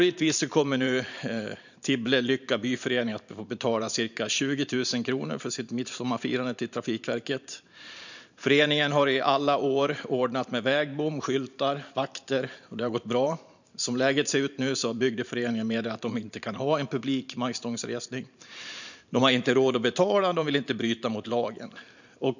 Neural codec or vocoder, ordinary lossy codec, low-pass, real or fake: vocoder, 44.1 kHz, 128 mel bands every 512 samples, BigVGAN v2; none; 7.2 kHz; fake